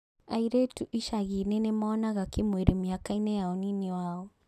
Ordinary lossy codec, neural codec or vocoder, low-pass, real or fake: none; none; 14.4 kHz; real